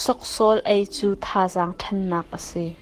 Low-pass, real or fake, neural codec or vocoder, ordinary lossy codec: 14.4 kHz; fake; codec, 44.1 kHz, 7.8 kbps, Pupu-Codec; Opus, 24 kbps